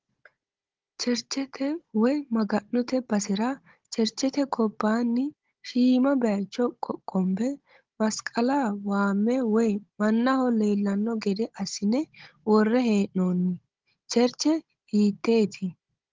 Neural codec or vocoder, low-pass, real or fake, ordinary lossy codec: codec, 16 kHz, 16 kbps, FunCodec, trained on Chinese and English, 50 frames a second; 7.2 kHz; fake; Opus, 16 kbps